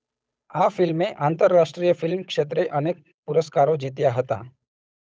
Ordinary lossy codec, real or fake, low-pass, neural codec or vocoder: none; fake; none; codec, 16 kHz, 8 kbps, FunCodec, trained on Chinese and English, 25 frames a second